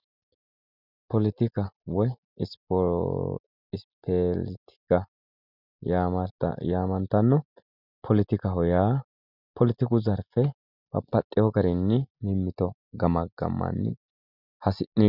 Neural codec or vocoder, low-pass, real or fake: none; 5.4 kHz; real